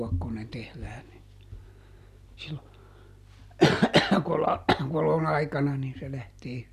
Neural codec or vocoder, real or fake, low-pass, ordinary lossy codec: none; real; 14.4 kHz; none